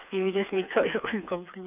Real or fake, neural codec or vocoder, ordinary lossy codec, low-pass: fake; codec, 16 kHz, 4 kbps, FreqCodec, smaller model; none; 3.6 kHz